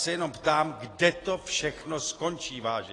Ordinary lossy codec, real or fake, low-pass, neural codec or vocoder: AAC, 32 kbps; real; 10.8 kHz; none